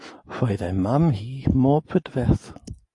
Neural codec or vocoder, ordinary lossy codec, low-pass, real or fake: none; AAC, 64 kbps; 10.8 kHz; real